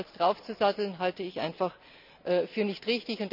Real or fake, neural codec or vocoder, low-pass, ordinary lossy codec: real; none; 5.4 kHz; none